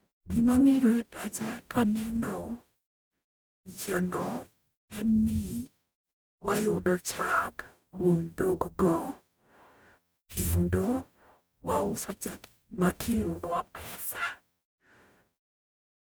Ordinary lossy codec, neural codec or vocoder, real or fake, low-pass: none; codec, 44.1 kHz, 0.9 kbps, DAC; fake; none